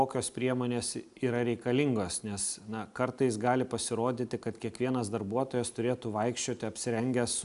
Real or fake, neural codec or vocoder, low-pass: real; none; 10.8 kHz